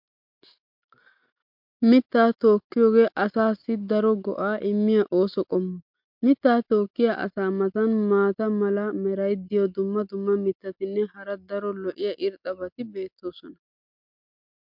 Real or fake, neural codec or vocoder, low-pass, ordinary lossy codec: real; none; 5.4 kHz; MP3, 48 kbps